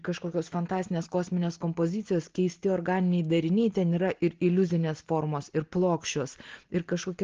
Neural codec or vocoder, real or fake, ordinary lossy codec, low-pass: none; real; Opus, 16 kbps; 7.2 kHz